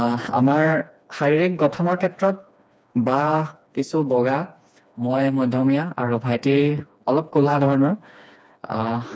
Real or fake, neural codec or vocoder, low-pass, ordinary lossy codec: fake; codec, 16 kHz, 2 kbps, FreqCodec, smaller model; none; none